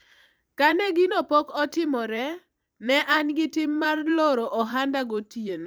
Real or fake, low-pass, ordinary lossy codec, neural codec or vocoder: fake; none; none; vocoder, 44.1 kHz, 128 mel bands every 512 samples, BigVGAN v2